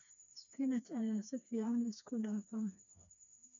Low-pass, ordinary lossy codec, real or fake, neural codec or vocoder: 7.2 kHz; none; fake; codec, 16 kHz, 2 kbps, FreqCodec, smaller model